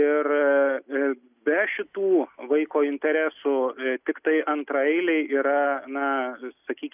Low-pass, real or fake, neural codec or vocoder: 3.6 kHz; real; none